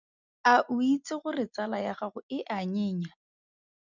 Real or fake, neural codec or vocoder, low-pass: real; none; 7.2 kHz